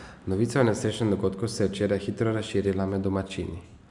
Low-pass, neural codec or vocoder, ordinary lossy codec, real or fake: 10.8 kHz; none; none; real